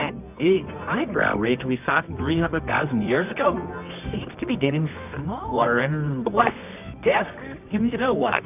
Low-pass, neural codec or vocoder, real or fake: 3.6 kHz; codec, 24 kHz, 0.9 kbps, WavTokenizer, medium music audio release; fake